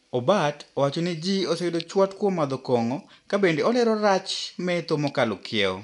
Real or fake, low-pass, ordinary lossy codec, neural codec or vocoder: real; 10.8 kHz; none; none